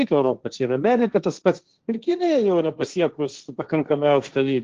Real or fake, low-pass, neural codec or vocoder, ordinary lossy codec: fake; 7.2 kHz; codec, 16 kHz, 1.1 kbps, Voila-Tokenizer; Opus, 16 kbps